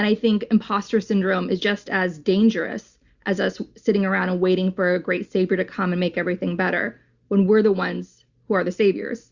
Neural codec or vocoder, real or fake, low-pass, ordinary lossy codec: none; real; 7.2 kHz; Opus, 64 kbps